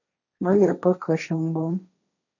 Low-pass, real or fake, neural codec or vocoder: 7.2 kHz; fake; codec, 16 kHz, 1.1 kbps, Voila-Tokenizer